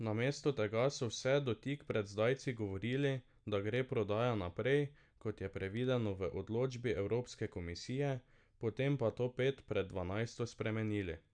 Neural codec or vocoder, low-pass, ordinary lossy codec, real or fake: none; 9.9 kHz; none; real